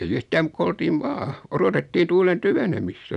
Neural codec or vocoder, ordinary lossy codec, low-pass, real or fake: none; none; 10.8 kHz; real